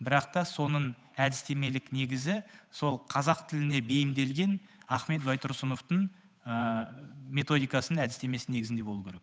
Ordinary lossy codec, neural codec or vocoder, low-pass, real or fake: none; codec, 16 kHz, 8 kbps, FunCodec, trained on Chinese and English, 25 frames a second; none; fake